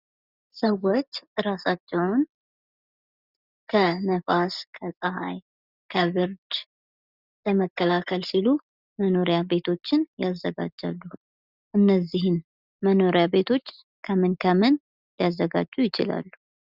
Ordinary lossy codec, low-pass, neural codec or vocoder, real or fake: Opus, 64 kbps; 5.4 kHz; none; real